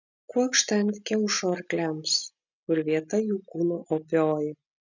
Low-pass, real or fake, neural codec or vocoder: 7.2 kHz; real; none